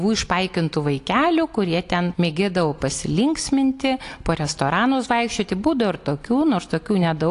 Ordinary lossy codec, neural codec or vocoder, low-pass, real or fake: AAC, 48 kbps; none; 10.8 kHz; real